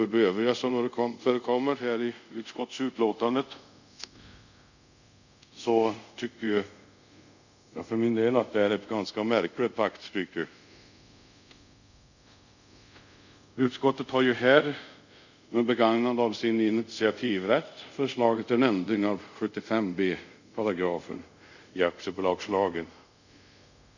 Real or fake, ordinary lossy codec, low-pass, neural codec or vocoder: fake; none; 7.2 kHz; codec, 24 kHz, 0.5 kbps, DualCodec